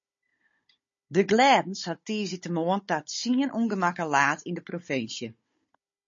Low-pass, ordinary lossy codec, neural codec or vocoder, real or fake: 7.2 kHz; MP3, 32 kbps; codec, 16 kHz, 16 kbps, FunCodec, trained on Chinese and English, 50 frames a second; fake